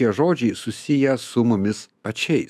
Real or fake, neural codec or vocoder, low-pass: fake; autoencoder, 48 kHz, 128 numbers a frame, DAC-VAE, trained on Japanese speech; 14.4 kHz